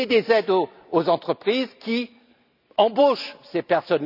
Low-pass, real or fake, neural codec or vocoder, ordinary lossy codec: 5.4 kHz; real; none; none